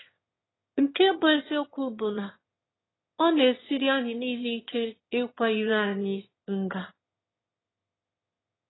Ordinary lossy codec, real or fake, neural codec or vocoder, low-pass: AAC, 16 kbps; fake; autoencoder, 22.05 kHz, a latent of 192 numbers a frame, VITS, trained on one speaker; 7.2 kHz